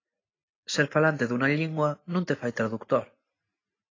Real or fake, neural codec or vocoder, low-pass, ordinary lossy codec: real; none; 7.2 kHz; AAC, 32 kbps